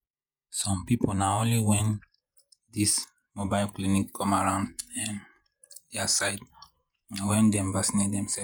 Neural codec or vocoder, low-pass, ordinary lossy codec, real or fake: vocoder, 48 kHz, 128 mel bands, Vocos; none; none; fake